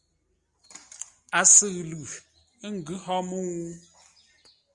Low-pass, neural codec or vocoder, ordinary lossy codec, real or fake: 10.8 kHz; none; Opus, 64 kbps; real